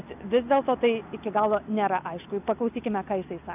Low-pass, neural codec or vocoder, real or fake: 3.6 kHz; none; real